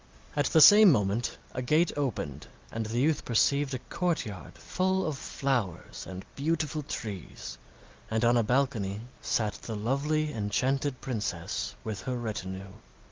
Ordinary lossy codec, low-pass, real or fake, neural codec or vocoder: Opus, 32 kbps; 7.2 kHz; real; none